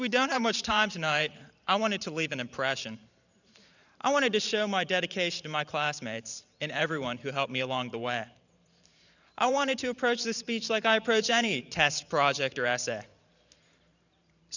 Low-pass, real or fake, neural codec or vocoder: 7.2 kHz; fake; vocoder, 44.1 kHz, 128 mel bands every 512 samples, BigVGAN v2